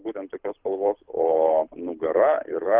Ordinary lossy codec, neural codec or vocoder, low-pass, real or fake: Opus, 32 kbps; codec, 24 kHz, 6 kbps, HILCodec; 3.6 kHz; fake